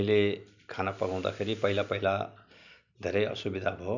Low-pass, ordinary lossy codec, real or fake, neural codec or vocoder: 7.2 kHz; none; real; none